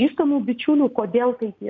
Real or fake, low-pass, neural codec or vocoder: real; 7.2 kHz; none